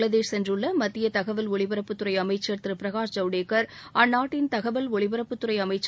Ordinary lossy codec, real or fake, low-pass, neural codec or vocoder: none; real; none; none